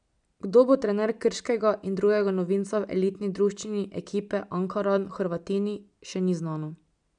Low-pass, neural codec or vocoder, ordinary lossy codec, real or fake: 9.9 kHz; none; none; real